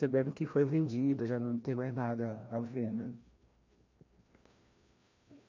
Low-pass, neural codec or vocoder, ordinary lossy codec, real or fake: 7.2 kHz; codec, 16 kHz, 1 kbps, FreqCodec, larger model; MP3, 48 kbps; fake